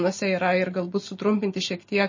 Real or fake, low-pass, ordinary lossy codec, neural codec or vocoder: real; 7.2 kHz; MP3, 32 kbps; none